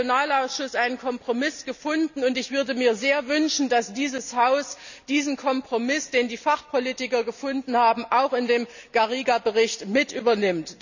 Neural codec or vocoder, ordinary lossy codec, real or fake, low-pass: none; none; real; 7.2 kHz